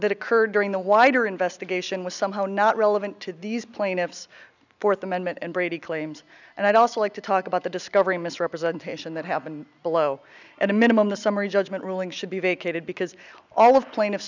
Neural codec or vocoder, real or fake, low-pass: none; real; 7.2 kHz